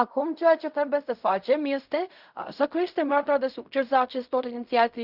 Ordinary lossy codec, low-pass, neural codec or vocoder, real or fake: Opus, 64 kbps; 5.4 kHz; codec, 16 kHz in and 24 kHz out, 0.4 kbps, LongCat-Audio-Codec, fine tuned four codebook decoder; fake